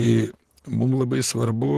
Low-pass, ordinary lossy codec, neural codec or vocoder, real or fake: 14.4 kHz; Opus, 16 kbps; vocoder, 44.1 kHz, 128 mel bands, Pupu-Vocoder; fake